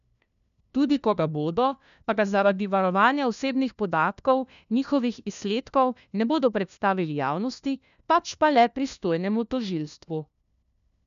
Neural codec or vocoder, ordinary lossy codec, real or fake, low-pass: codec, 16 kHz, 1 kbps, FunCodec, trained on LibriTTS, 50 frames a second; none; fake; 7.2 kHz